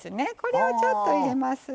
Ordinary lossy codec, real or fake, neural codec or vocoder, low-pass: none; real; none; none